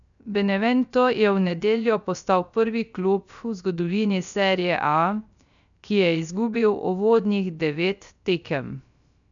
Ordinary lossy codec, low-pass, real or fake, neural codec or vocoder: none; 7.2 kHz; fake; codec, 16 kHz, 0.3 kbps, FocalCodec